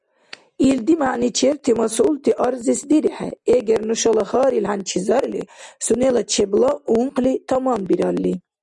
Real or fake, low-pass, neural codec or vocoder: real; 10.8 kHz; none